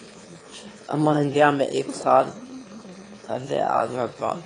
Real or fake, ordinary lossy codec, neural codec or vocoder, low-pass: fake; AAC, 32 kbps; autoencoder, 22.05 kHz, a latent of 192 numbers a frame, VITS, trained on one speaker; 9.9 kHz